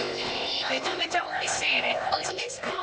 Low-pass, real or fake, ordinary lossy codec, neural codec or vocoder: none; fake; none; codec, 16 kHz, 0.8 kbps, ZipCodec